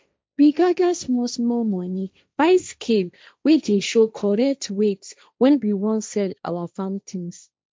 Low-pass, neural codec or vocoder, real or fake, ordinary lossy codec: none; codec, 16 kHz, 1.1 kbps, Voila-Tokenizer; fake; none